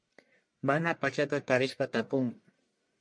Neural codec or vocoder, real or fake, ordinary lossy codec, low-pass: codec, 44.1 kHz, 1.7 kbps, Pupu-Codec; fake; MP3, 64 kbps; 9.9 kHz